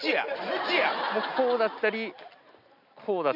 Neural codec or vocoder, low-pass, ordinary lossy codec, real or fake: none; 5.4 kHz; none; real